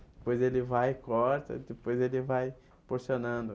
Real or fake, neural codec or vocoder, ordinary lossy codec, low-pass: real; none; none; none